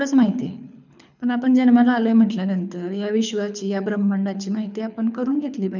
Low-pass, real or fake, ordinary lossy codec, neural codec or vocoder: 7.2 kHz; fake; none; codec, 24 kHz, 6 kbps, HILCodec